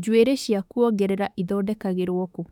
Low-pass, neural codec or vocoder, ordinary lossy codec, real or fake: 19.8 kHz; autoencoder, 48 kHz, 32 numbers a frame, DAC-VAE, trained on Japanese speech; none; fake